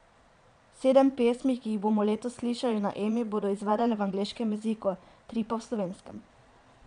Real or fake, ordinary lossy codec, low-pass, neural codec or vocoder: fake; none; 9.9 kHz; vocoder, 22.05 kHz, 80 mel bands, Vocos